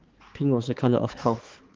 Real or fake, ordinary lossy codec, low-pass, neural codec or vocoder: fake; Opus, 16 kbps; 7.2 kHz; codec, 16 kHz, 4 kbps, X-Codec, HuBERT features, trained on balanced general audio